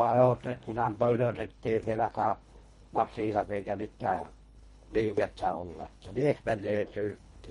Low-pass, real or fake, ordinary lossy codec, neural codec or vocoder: 10.8 kHz; fake; MP3, 48 kbps; codec, 24 kHz, 1.5 kbps, HILCodec